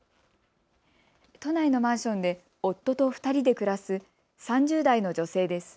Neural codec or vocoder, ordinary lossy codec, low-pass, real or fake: none; none; none; real